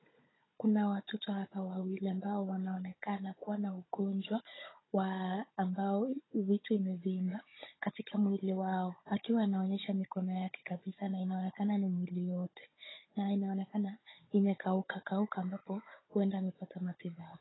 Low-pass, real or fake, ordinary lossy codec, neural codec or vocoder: 7.2 kHz; fake; AAC, 16 kbps; codec, 16 kHz, 16 kbps, FunCodec, trained on Chinese and English, 50 frames a second